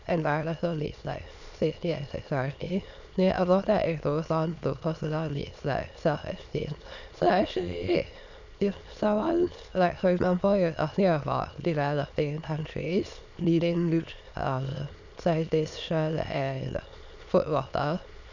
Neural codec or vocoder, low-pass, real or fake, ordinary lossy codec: autoencoder, 22.05 kHz, a latent of 192 numbers a frame, VITS, trained on many speakers; 7.2 kHz; fake; none